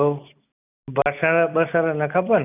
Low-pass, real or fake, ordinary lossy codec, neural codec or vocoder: 3.6 kHz; real; none; none